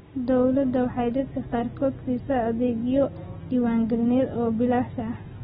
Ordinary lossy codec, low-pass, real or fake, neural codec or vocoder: AAC, 16 kbps; 19.8 kHz; real; none